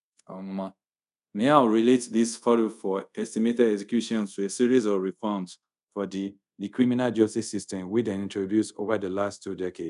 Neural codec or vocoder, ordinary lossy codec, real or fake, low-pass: codec, 24 kHz, 0.5 kbps, DualCodec; none; fake; 10.8 kHz